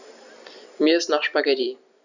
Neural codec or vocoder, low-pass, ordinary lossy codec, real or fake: none; 7.2 kHz; none; real